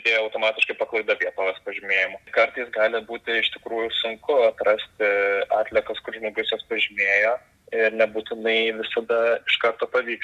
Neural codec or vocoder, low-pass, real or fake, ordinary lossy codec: none; 14.4 kHz; real; Opus, 64 kbps